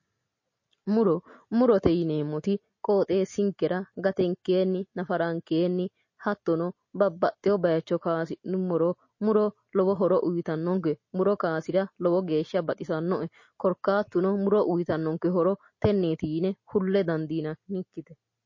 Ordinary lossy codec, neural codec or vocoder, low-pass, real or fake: MP3, 32 kbps; none; 7.2 kHz; real